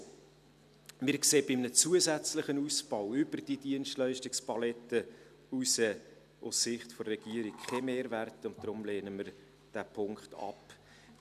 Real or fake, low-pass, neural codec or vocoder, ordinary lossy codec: real; 14.4 kHz; none; none